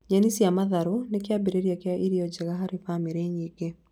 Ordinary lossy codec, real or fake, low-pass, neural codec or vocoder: none; real; 19.8 kHz; none